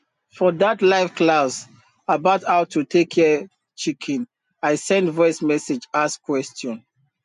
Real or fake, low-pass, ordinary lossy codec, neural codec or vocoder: real; 10.8 kHz; MP3, 64 kbps; none